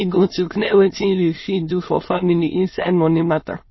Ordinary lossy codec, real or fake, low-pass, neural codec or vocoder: MP3, 24 kbps; fake; 7.2 kHz; autoencoder, 22.05 kHz, a latent of 192 numbers a frame, VITS, trained on many speakers